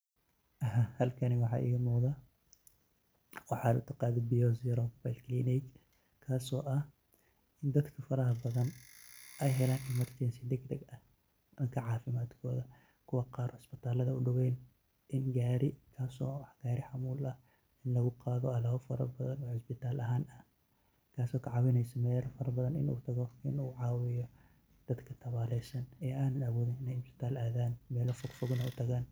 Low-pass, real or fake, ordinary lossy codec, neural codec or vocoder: none; real; none; none